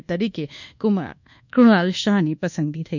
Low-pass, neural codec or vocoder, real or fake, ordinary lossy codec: 7.2 kHz; codec, 24 kHz, 1.2 kbps, DualCodec; fake; none